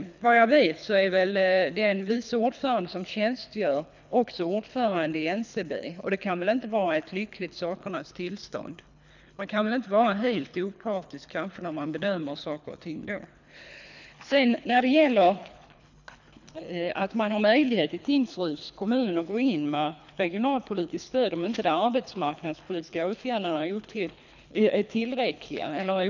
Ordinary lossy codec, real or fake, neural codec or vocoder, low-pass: none; fake; codec, 24 kHz, 3 kbps, HILCodec; 7.2 kHz